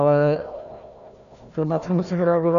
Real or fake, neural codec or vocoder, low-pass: fake; codec, 16 kHz, 1 kbps, FunCodec, trained on Chinese and English, 50 frames a second; 7.2 kHz